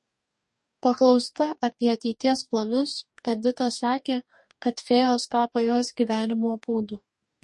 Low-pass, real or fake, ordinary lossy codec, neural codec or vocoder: 10.8 kHz; fake; MP3, 48 kbps; codec, 44.1 kHz, 2.6 kbps, DAC